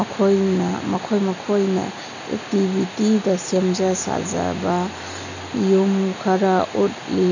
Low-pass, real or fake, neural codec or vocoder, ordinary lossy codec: 7.2 kHz; real; none; none